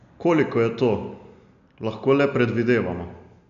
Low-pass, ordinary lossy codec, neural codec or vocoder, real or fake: 7.2 kHz; none; none; real